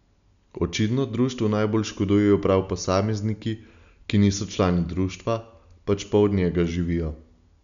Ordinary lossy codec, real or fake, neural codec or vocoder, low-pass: none; real; none; 7.2 kHz